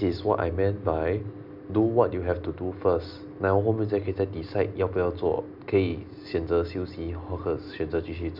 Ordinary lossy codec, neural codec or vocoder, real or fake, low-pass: none; none; real; 5.4 kHz